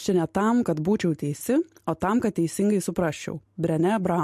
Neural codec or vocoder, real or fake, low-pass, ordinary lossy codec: none; real; 14.4 kHz; MP3, 64 kbps